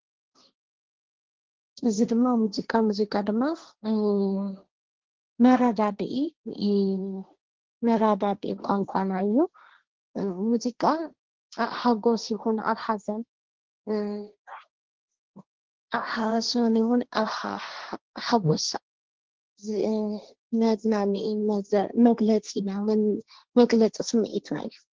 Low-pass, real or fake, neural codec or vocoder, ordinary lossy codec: 7.2 kHz; fake; codec, 16 kHz, 1.1 kbps, Voila-Tokenizer; Opus, 16 kbps